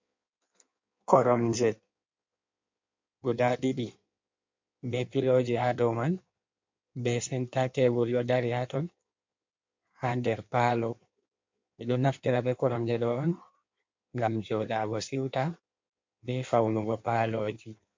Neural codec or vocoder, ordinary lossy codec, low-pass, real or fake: codec, 16 kHz in and 24 kHz out, 1.1 kbps, FireRedTTS-2 codec; MP3, 48 kbps; 7.2 kHz; fake